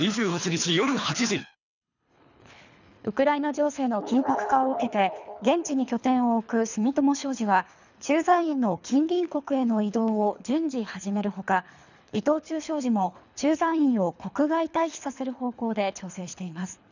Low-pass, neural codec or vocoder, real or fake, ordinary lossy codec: 7.2 kHz; codec, 24 kHz, 3 kbps, HILCodec; fake; none